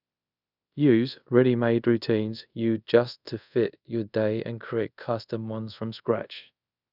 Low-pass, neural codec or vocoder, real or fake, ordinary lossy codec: 5.4 kHz; codec, 24 kHz, 0.5 kbps, DualCodec; fake; none